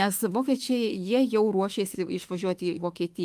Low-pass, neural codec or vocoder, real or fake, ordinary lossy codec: 14.4 kHz; autoencoder, 48 kHz, 32 numbers a frame, DAC-VAE, trained on Japanese speech; fake; Opus, 32 kbps